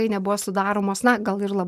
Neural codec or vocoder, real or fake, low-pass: none; real; 14.4 kHz